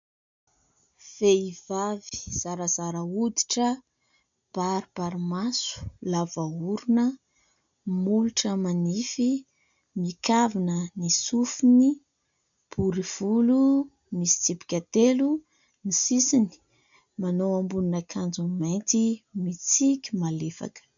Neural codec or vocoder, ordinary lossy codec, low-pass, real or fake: none; MP3, 96 kbps; 7.2 kHz; real